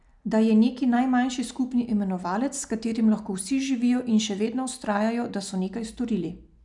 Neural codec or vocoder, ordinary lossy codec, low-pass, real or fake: none; none; 10.8 kHz; real